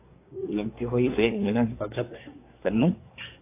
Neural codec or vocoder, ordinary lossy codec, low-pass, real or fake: codec, 24 kHz, 1 kbps, SNAC; AAC, 24 kbps; 3.6 kHz; fake